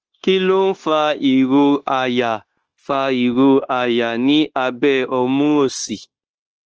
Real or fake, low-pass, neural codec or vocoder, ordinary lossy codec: fake; 7.2 kHz; codec, 16 kHz, 0.9 kbps, LongCat-Audio-Codec; Opus, 16 kbps